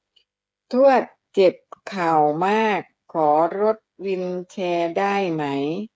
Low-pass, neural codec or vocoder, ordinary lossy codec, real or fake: none; codec, 16 kHz, 8 kbps, FreqCodec, smaller model; none; fake